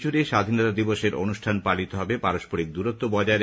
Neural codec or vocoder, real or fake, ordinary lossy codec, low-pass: none; real; none; none